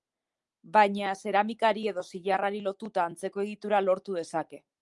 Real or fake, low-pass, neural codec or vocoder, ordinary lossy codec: real; 10.8 kHz; none; Opus, 32 kbps